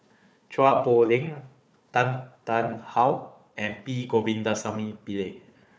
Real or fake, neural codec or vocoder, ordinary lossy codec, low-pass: fake; codec, 16 kHz, 4 kbps, FunCodec, trained on Chinese and English, 50 frames a second; none; none